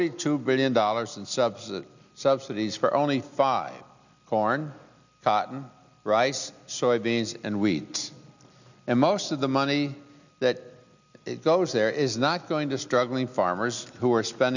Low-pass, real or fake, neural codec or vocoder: 7.2 kHz; real; none